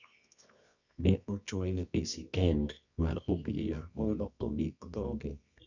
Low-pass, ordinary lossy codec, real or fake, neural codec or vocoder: 7.2 kHz; none; fake; codec, 24 kHz, 0.9 kbps, WavTokenizer, medium music audio release